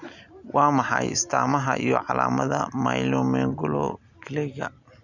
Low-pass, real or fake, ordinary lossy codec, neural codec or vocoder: 7.2 kHz; real; none; none